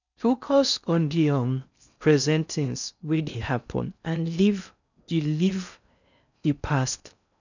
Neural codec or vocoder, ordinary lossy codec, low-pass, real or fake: codec, 16 kHz in and 24 kHz out, 0.6 kbps, FocalCodec, streaming, 4096 codes; none; 7.2 kHz; fake